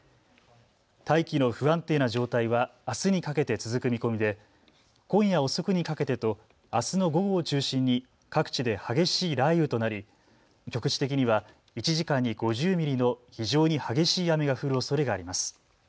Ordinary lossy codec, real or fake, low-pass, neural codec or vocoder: none; real; none; none